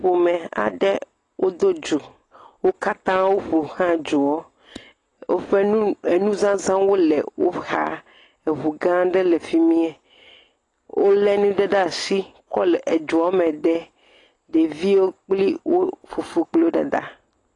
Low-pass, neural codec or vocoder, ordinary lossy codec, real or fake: 10.8 kHz; none; AAC, 32 kbps; real